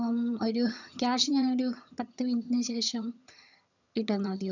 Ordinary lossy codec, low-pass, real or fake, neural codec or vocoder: none; 7.2 kHz; fake; vocoder, 22.05 kHz, 80 mel bands, HiFi-GAN